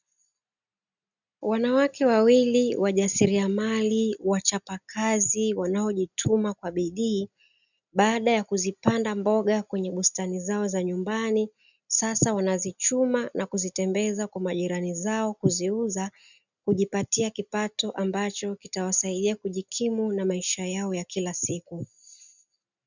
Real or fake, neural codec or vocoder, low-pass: real; none; 7.2 kHz